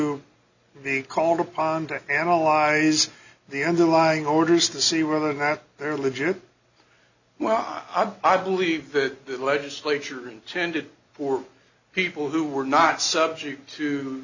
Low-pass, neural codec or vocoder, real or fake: 7.2 kHz; none; real